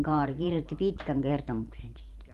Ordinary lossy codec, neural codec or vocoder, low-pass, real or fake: Opus, 32 kbps; vocoder, 44.1 kHz, 128 mel bands every 512 samples, BigVGAN v2; 14.4 kHz; fake